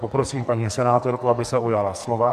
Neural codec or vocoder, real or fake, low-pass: codec, 44.1 kHz, 2.6 kbps, SNAC; fake; 14.4 kHz